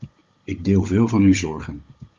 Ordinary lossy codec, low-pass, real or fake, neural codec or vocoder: Opus, 32 kbps; 7.2 kHz; fake; codec, 16 kHz, 16 kbps, FunCodec, trained on Chinese and English, 50 frames a second